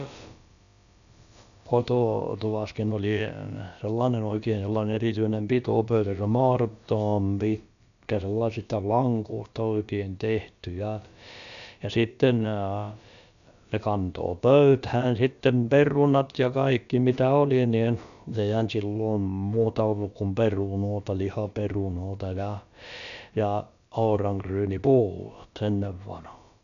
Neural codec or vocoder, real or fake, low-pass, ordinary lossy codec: codec, 16 kHz, about 1 kbps, DyCAST, with the encoder's durations; fake; 7.2 kHz; none